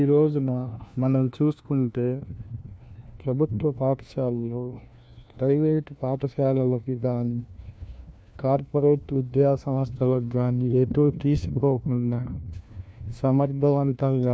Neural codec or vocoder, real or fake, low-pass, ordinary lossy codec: codec, 16 kHz, 1 kbps, FunCodec, trained on LibriTTS, 50 frames a second; fake; none; none